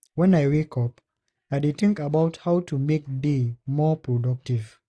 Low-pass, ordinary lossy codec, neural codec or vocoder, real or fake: none; none; none; real